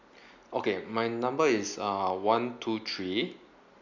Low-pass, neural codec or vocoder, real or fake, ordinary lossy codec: 7.2 kHz; none; real; none